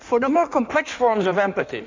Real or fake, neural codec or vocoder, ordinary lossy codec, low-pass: fake; codec, 16 kHz in and 24 kHz out, 1.1 kbps, FireRedTTS-2 codec; MP3, 64 kbps; 7.2 kHz